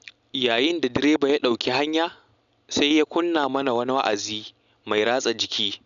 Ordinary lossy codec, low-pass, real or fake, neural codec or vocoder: none; 7.2 kHz; real; none